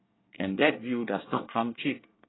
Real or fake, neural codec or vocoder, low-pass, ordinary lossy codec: fake; codec, 24 kHz, 1 kbps, SNAC; 7.2 kHz; AAC, 16 kbps